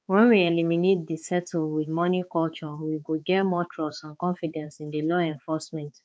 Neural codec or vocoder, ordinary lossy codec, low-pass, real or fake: codec, 16 kHz, 4 kbps, X-Codec, HuBERT features, trained on balanced general audio; none; none; fake